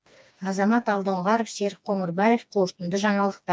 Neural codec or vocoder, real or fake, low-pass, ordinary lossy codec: codec, 16 kHz, 2 kbps, FreqCodec, smaller model; fake; none; none